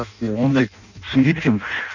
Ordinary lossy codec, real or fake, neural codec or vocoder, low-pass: none; fake; codec, 16 kHz in and 24 kHz out, 0.6 kbps, FireRedTTS-2 codec; 7.2 kHz